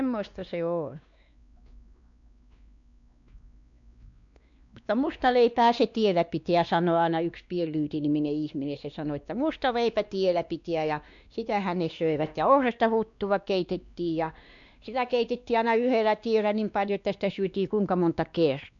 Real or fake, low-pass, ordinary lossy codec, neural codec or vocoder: fake; 7.2 kHz; none; codec, 16 kHz, 2 kbps, X-Codec, WavLM features, trained on Multilingual LibriSpeech